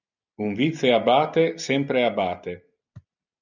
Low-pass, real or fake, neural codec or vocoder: 7.2 kHz; real; none